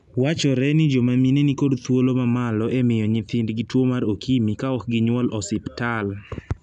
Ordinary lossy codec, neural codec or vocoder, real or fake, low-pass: none; none; real; 10.8 kHz